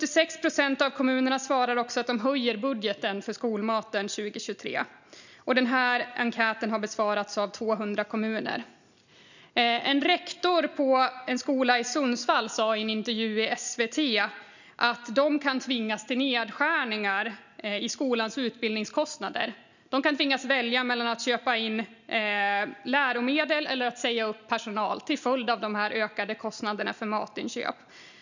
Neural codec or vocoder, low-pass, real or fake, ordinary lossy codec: none; 7.2 kHz; real; none